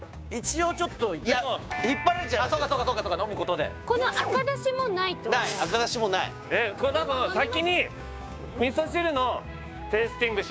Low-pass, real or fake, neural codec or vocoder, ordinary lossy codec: none; fake; codec, 16 kHz, 6 kbps, DAC; none